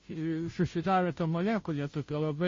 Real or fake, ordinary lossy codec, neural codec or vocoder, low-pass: fake; MP3, 32 kbps; codec, 16 kHz, 0.5 kbps, FunCodec, trained on Chinese and English, 25 frames a second; 7.2 kHz